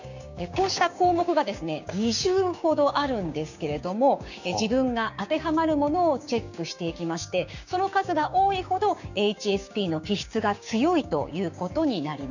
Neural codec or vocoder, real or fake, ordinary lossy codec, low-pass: codec, 16 kHz, 6 kbps, DAC; fake; none; 7.2 kHz